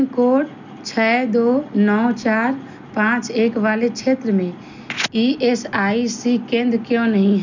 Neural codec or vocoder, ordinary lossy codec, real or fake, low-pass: none; none; real; 7.2 kHz